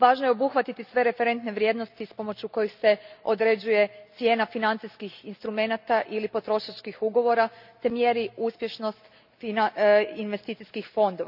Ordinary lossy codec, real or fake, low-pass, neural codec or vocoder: none; real; 5.4 kHz; none